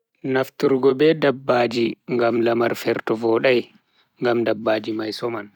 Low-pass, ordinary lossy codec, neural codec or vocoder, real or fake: 19.8 kHz; none; vocoder, 44.1 kHz, 128 mel bands every 256 samples, BigVGAN v2; fake